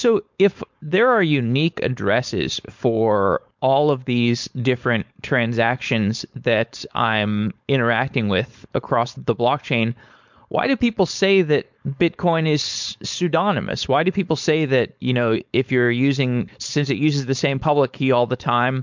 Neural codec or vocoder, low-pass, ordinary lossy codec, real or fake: codec, 16 kHz, 4.8 kbps, FACodec; 7.2 kHz; MP3, 64 kbps; fake